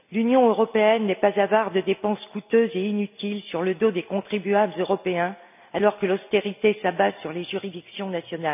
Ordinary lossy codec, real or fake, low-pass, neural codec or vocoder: none; real; 3.6 kHz; none